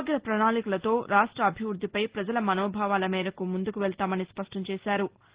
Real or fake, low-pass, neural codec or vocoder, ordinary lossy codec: real; 3.6 kHz; none; Opus, 16 kbps